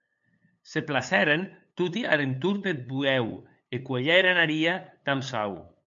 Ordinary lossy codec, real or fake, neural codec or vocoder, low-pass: MP3, 64 kbps; fake; codec, 16 kHz, 8 kbps, FunCodec, trained on LibriTTS, 25 frames a second; 7.2 kHz